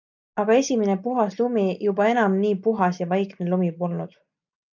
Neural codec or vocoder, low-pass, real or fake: none; 7.2 kHz; real